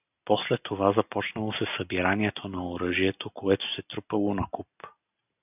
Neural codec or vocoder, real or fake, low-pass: none; real; 3.6 kHz